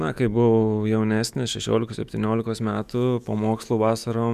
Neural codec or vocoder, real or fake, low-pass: autoencoder, 48 kHz, 128 numbers a frame, DAC-VAE, trained on Japanese speech; fake; 14.4 kHz